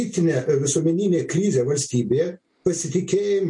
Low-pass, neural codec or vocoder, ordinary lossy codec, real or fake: 10.8 kHz; none; MP3, 48 kbps; real